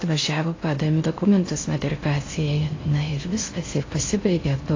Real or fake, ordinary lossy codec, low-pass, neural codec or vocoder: fake; AAC, 32 kbps; 7.2 kHz; codec, 16 kHz in and 24 kHz out, 0.6 kbps, FocalCodec, streaming, 4096 codes